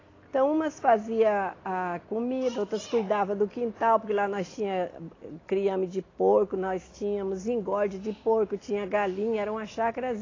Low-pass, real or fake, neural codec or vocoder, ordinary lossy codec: 7.2 kHz; real; none; AAC, 32 kbps